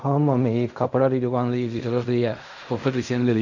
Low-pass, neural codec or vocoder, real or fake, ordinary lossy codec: 7.2 kHz; codec, 16 kHz in and 24 kHz out, 0.4 kbps, LongCat-Audio-Codec, fine tuned four codebook decoder; fake; none